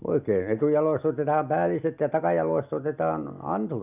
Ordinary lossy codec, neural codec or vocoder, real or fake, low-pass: none; none; real; 3.6 kHz